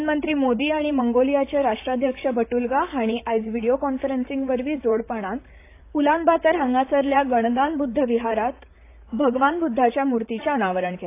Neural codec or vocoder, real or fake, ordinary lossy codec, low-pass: vocoder, 44.1 kHz, 128 mel bands, Pupu-Vocoder; fake; AAC, 24 kbps; 3.6 kHz